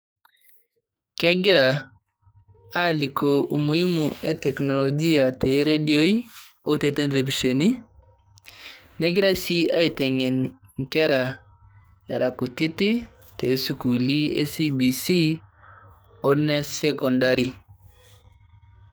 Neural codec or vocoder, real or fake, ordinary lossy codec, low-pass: codec, 44.1 kHz, 2.6 kbps, SNAC; fake; none; none